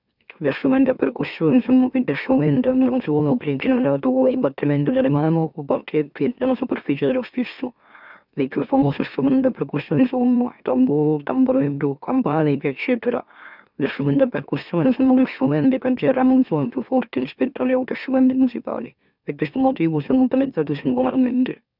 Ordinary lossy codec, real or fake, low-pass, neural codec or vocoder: none; fake; 5.4 kHz; autoencoder, 44.1 kHz, a latent of 192 numbers a frame, MeloTTS